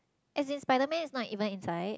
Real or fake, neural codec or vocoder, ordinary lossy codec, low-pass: real; none; none; none